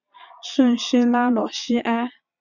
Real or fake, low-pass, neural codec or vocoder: fake; 7.2 kHz; vocoder, 22.05 kHz, 80 mel bands, Vocos